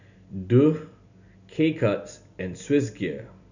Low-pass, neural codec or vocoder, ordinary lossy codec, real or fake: 7.2 kHz; none; none; real